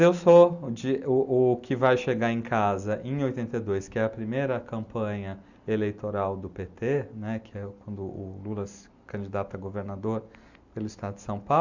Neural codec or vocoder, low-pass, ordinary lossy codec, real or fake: none; 7.2 kHz; Opus, 64 kbps; real